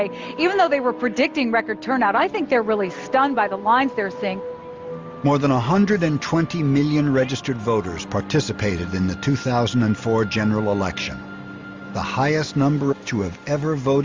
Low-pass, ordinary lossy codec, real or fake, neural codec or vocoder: 7.2 kHz; Opus, 32 kbps; real; none